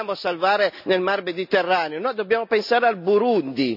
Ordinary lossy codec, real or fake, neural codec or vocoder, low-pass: none; real; none; 5.4 kHz